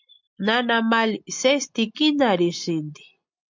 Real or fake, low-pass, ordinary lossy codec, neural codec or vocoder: real; 7.2 kHz; MP3, 64 kbps; none